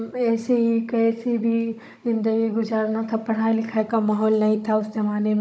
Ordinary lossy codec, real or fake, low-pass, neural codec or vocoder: none; fake; none; codec, 16 kHz, 16 kbps, FunCodec, trained on Chinese and English, 50 frames a second